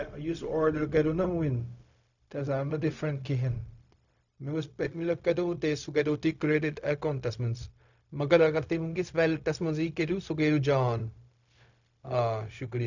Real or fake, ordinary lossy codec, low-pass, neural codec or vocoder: fake; none; 7.2 kHz; codec, 16 kHz, 0.4 kbps, LongCat-Audio-Codec